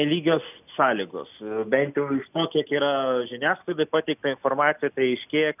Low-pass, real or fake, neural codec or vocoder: 3.6 kHz; real; none